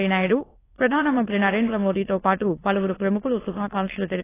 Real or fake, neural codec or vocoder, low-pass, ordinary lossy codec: fake; autoencoder, 22.05 kHz, a latent of 192 numbers a frame, VITS, trained on many speakers; 3.6 kHz; AAC, 16 kbps